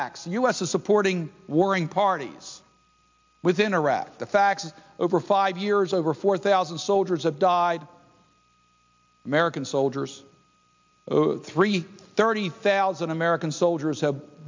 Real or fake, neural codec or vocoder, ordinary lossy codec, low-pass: real; none; MP3, 64 kbps; 7.2 kHz